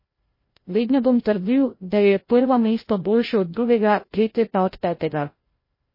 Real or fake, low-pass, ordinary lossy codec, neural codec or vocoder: fake; 5.4 kHz; MP3, 24 kbps; codec, 16 kHz, 0.5 kbps, FreqCodec, larger model